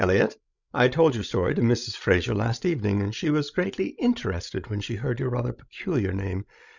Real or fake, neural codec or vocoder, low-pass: fake; codec, 16 kHz, 16 kbps, FreqCodec, larger model; 7.2 kHz